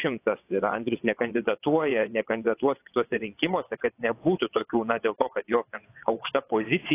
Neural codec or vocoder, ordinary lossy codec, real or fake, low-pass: vocoder, 22.05 kHz, 80 mel bands, WaveNeXt; AAC, 24 kbps; fake; 3.6 kHz